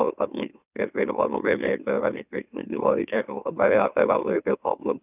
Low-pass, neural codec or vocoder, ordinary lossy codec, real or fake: 3.6 kHz; autoencoder, 44.1 kHz, a latent of 192 numbers a frame, MeloTTS; none; fake